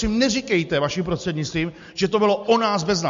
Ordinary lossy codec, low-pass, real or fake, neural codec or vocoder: MP3, 48 kbps; 7.2 kHz; real; none